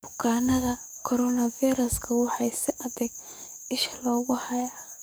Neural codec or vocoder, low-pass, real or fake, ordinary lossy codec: codec, 44.1 kHz, 7.8 kbps, DAC; none; fake; none